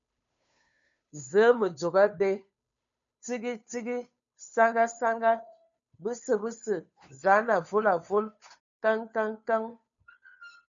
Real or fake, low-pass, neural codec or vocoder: fake; 7.2 kHz; codec, 16 kHz, 2 kbps, FunCodec, trained on Chinese and English, 25 frames a second